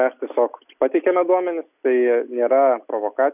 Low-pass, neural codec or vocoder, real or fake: 3.6 kHz; none; real